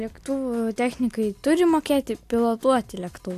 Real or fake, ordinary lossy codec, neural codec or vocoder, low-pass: real; AAC, 64 kbps; none; 14.4 kHz